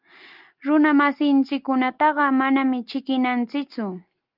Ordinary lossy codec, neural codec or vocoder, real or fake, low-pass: Opus, 32 kbps; none; real; 5.4 kHz